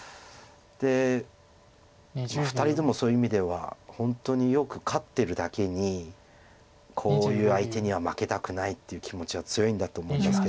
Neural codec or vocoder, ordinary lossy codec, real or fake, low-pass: none; none; real; none